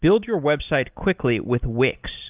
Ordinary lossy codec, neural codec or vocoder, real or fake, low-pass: Opus, 32 kbps; none; real; 3.6 kHz